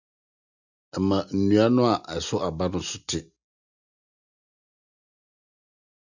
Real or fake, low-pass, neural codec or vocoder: real; 7.2 kHz; none